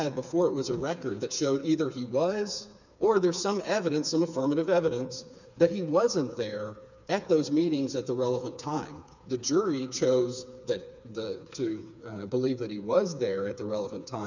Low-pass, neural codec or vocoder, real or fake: 7.2 kHz; codec, 16 kHz, 4 kbps, FreqCodec, smaller model; fake